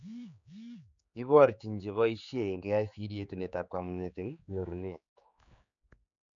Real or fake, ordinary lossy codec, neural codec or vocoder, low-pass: fake; none; codec, 16 kHz, 4 kbps, X-Codec, HuBERT features, trained on general audio; 7.2 kHz